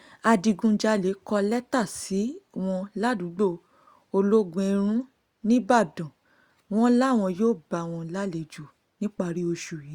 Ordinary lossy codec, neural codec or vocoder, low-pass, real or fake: Opus, 64 kbps; none; 19.8 kHz; real